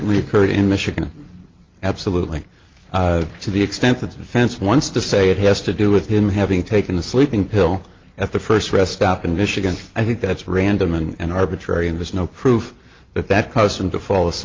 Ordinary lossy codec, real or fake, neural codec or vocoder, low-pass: Opus, 32 kbps; real; none; 7.2 kHz